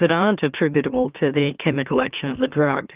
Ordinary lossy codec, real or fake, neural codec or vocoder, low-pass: Opus, 32 kbps; fake; autoencoder, 44.1 kHz, a latent of 192 numbers a frame, MeloTTS; 3.6 kHz